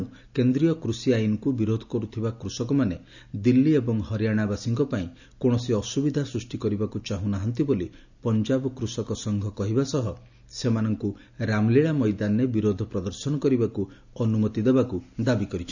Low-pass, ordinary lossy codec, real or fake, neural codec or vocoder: 7.2 kHz; none; real; none